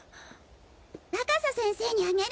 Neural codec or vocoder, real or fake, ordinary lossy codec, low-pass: none; real; none; none